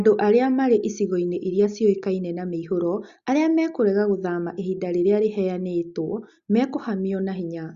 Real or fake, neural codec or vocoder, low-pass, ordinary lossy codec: real; none; 7.2 kHz; Opus, 64 kbps